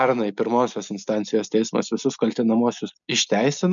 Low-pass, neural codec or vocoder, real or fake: 7.2 kHz; none; real